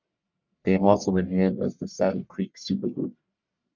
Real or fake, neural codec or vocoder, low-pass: fake; codec, 44.1 kHz, 1.7 kbps, Pupu-Codec; 7.2 kHz